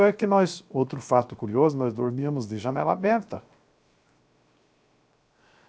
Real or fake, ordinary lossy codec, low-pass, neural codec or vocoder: fake; none; none; codec, 16 kHz, 0.7 kbps, FocalCodec